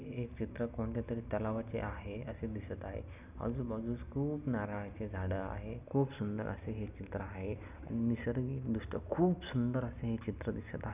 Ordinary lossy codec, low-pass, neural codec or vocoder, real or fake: none; 3.6 kHz; none; real